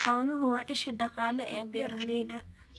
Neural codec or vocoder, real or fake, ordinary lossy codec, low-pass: codec, 24 kHz, 0.9 kbps, WavTokenizer, medium music audio release; fake; none; none